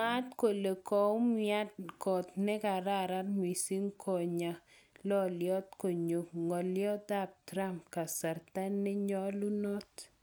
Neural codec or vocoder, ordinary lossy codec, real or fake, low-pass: none; none; real; none